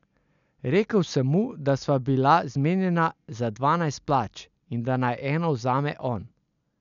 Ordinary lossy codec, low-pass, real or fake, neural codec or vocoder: none; 7.2 kHz; real; none